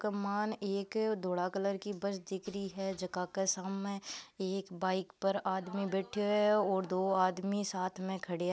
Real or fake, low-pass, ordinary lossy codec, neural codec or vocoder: real; none; none; none